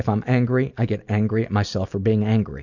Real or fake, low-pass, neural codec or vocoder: real; 7.2 kHz; none